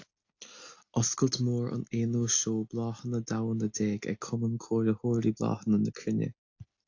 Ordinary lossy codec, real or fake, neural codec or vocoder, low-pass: AAC, 48 kbps; real; none; 7.2 kHz